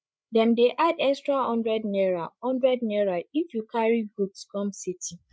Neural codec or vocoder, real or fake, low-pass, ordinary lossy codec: codec, 16 kHz, 8 kbps, FreqCodec, larger model; fake; none; none